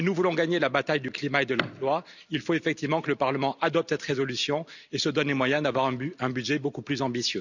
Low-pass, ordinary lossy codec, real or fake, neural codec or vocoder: 7.2 kHz; none; real; none